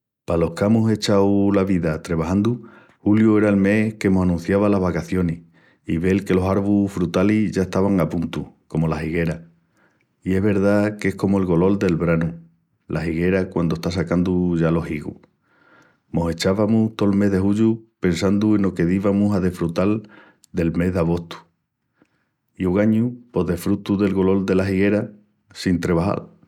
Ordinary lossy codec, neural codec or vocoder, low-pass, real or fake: none; none; 19.8 kHz; real